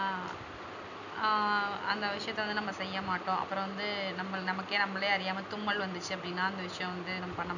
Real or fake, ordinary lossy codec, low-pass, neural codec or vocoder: real; none; 7.2 kHz; none